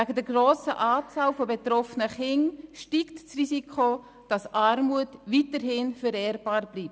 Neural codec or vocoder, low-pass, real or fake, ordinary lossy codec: none; none; real; none